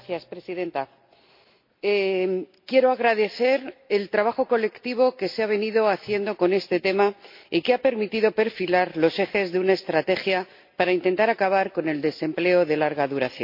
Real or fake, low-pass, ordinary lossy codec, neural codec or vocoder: real; 5.4 kHz; MP3, 32 kbps; none